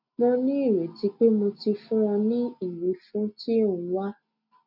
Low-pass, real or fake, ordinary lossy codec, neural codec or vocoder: 5.4 kHz; real; none; none